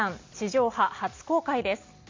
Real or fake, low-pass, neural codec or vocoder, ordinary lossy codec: real; 7.2 kHz; none; MP3, 48 kbps